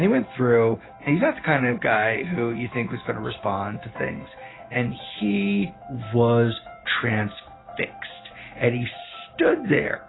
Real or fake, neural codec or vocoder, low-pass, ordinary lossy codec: real; none; 7.2 kHz; AAC, 16 kbps